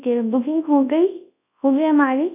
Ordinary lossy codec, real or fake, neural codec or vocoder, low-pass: none; fake; codec, 24 kHz, 0.9 kbps, WavTokenizer, large speech release; 3.6 kHz